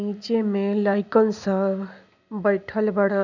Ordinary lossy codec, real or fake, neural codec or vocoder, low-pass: none; real; none; 7.2 kHz